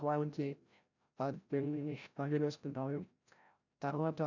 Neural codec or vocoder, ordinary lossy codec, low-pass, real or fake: codec, 16 kHz, 0.5 kbps, FreqCodec, larger model; none; 7.2 kHz; fake